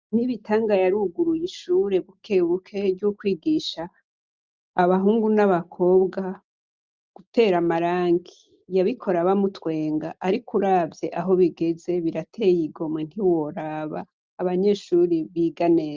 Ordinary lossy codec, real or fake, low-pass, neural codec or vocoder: Opus, 24 kbps; real; 7.2 kHz; none